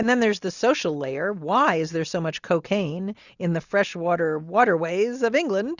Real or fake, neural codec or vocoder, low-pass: real; none; 7.2 kHz